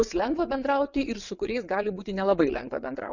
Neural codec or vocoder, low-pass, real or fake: vocoder, 22.05 kHz, 80 mel bands, WaveNeXt; 7.2 kHz; fake